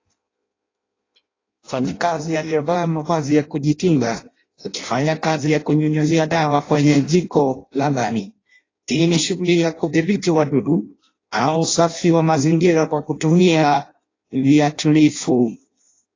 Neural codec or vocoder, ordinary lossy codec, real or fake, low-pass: codec, 16 kHz in and 24 kHz out, 0.6 kbps, FireRedTTS-2 codec; AAC, 32 kbps; fake; 7.2 kHz